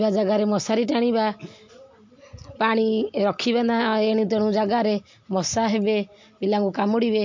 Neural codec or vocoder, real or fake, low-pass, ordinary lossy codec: none; real; 7.2 kHz; MP3, 48 kbps